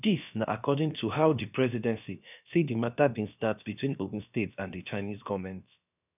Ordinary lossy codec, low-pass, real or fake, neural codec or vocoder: none; 3.6 kHz; fake; codec, 16 kHz, about 1 kbps, DyCAST, with the encoder's durations